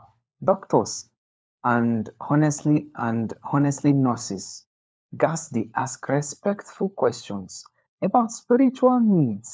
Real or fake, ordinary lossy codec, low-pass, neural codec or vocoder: fake; none; none; codec, 16 kHz, 4 kbps, FunCodec, trained on LibriTTS, 50 frames a second